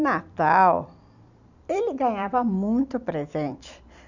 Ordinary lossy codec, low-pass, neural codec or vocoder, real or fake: none; 7.2 kHz; vocoder, 44.1 kHz, 128 mel bands every 256 samples, BigVGAN v2; fake